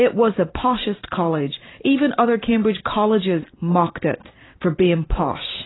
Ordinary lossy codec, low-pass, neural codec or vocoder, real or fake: AAC, 16 kbps; 7.2 kHz; vocoder, 44.1 kHz, 128 mel bands every 512 samples, BigVGAN v2; fake